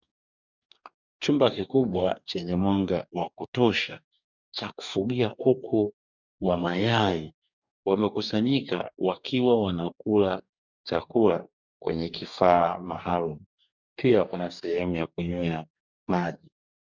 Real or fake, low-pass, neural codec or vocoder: fake; 7.2 kHz; codec, 44.1 kHz, 2.6 kbps, DAC